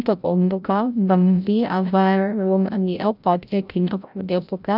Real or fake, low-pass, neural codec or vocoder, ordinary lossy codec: fake; 5.4 kHz; codec, 16 kHz, 0.5 kbps, FreqCodec, larger model; none